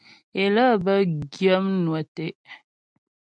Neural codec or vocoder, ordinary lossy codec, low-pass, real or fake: none; MP3, 96 kbps; 9.9 kHz; real